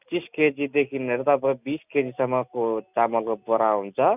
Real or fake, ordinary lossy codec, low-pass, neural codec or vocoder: real; none; 3.6 kHz; none